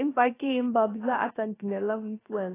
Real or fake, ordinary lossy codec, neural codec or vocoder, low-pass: fake; AAC, 16 kbps; codec, 16 kHz, 0.3 kbps, FocalCodec; 3.6 kHz